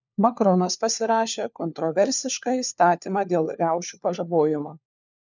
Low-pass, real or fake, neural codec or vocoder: 7.2 kHz; fake; codec, 16 kHz, 4 kbps, FunCodec, trained on LibriTTS, 50 frames a second